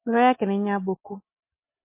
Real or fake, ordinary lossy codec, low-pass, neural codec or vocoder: real; MP3, 24 kbps; 3.6 kHz; none